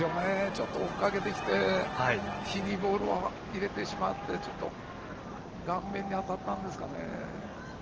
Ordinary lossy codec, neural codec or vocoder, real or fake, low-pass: Opus, 16 kbps; none; real; 7.2 kHz